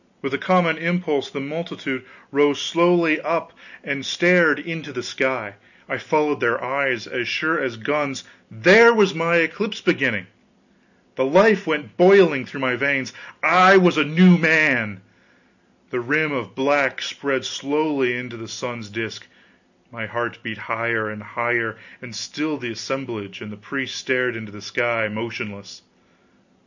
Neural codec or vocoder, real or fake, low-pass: none; real; 7.2 kHz